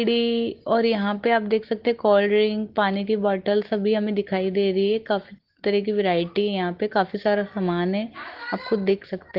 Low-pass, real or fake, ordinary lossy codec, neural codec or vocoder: 5.4 kHz; real; Opus, 16 kbps; none